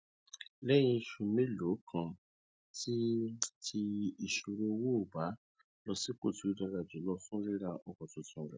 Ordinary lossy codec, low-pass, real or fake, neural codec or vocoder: none; none; real; none